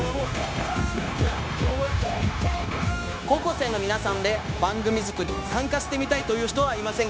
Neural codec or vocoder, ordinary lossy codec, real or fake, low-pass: codec, 16 kHz, 0.9 kbps, LongCat-Audio-Codec; none; fake; none